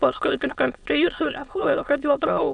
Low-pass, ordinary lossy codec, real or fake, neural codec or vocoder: 9.9 kHz; MP3, 96 kbps; fake; autoencoder, 22.05 kHz, a latent of 192 numbers a frame, VITS, trained on many speakers